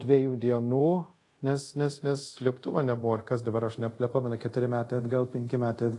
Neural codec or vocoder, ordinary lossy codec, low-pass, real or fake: codec, 24 kHz, 0.5 kbps, DualCodec; AAC, 48 kbps; 10.8 kHz; fake